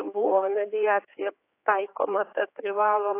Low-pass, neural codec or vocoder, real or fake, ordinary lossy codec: 3.6 kHz; codec, 16 kHz, 4 kbps, X-Codec, HuBERT features, trained on general audio; fake; AAC, 24 kbps